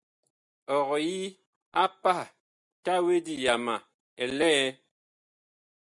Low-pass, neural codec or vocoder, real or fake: 10.8 kHz; none; real